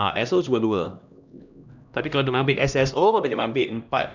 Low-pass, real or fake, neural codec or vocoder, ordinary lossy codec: 7.2 kHz; fake; codec, 16 kHz, 1 kbps, X-Codec, HuBERT features, trained on LibriSpeech; none